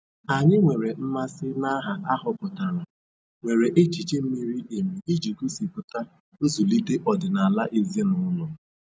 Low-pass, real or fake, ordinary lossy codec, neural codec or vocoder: none; real; none; none